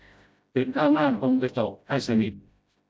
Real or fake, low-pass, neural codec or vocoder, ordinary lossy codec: fake; none; codec, 16 kHz, 0.5 kbps, FreqCodec, smaller model; none